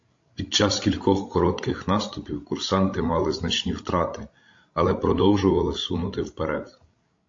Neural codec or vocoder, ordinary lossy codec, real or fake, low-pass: codec, 16 kHz, 16 kbps, FreqCodec, larger model; AAC, 48 kbps; fake; 7.2 kHz